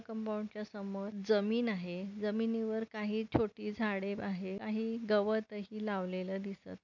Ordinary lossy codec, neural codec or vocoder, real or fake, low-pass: none; none; real; 7.2 kHz